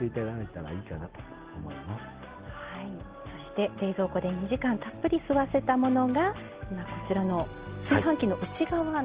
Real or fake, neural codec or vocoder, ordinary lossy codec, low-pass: real; none; Opus, 16 kbps; 3.6 kHz